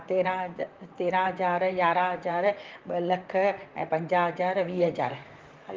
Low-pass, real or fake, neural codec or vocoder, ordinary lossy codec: 7.2 kHz; fake; vocoder, 44.1 kHz, 128 mel bands, Pupu-Vocoder; Opus, 32 kbps